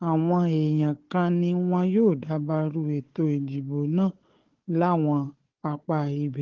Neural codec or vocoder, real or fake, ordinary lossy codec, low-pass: codec, 16 kHz, 4 kbps, FunCodec, trained on Chinese and English, 50 frames a second; fake; Opus, 16 kbps; 7.2 kHz